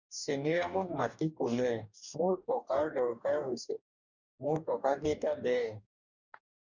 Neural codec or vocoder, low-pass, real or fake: codec, 44.1 kHz, 2.6 kbps, DAC; 7.2 kHz; fake